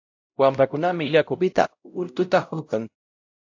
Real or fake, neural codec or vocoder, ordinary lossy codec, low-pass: fake; codec, 16 kHz, 0.5 kbps, X-Codec, WavLM features, trained on Multilingual LibriSpeech; AAC, 48 kbps; 7.2 kHz